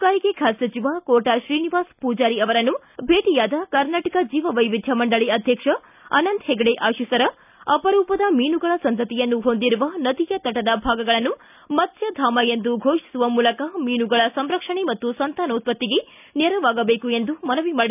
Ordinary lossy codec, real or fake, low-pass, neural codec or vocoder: none; real; 3.6 kHz; none